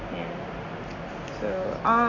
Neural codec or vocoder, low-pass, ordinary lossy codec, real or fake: none; 7.2 kHz; none; real